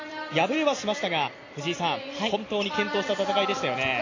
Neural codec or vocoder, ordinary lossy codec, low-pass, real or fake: none; AAC, 32 kbps; 7.2 kHz; real